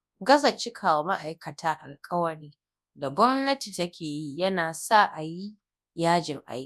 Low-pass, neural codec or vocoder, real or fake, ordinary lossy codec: none; codec, 24 kHz, 0.9 kbps, WavTokenizer, large speech release; fake; none